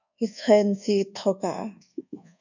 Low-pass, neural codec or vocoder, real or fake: 7.2 kHz; codec, 24 kHz, 1.2 kbps, DualCodec; fake